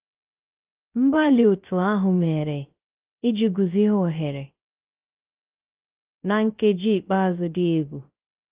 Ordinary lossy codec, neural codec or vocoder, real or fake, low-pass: Opus, 32 kbps; codec, 16 kHz, 0.3 kbps, FocalCodec; fake; 3.6 kHz